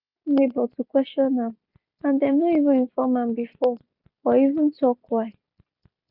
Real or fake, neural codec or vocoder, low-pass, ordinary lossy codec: real; none; 5.4 kHz; none